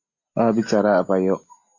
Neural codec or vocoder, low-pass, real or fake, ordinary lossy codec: none; 7.2 kHz; real; MP3, 32 kbps